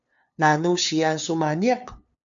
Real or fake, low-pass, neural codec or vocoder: fake; 7.2 kHz; codec, 16 kHz, 2 kbps, FunCodec, trained on LibriTTS, 25 frames a second